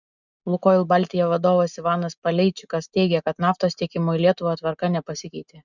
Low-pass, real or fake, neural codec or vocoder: 7.2 kHz; real; none